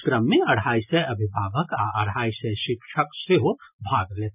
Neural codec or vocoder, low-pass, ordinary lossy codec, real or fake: none; 3.6 kHz; none; real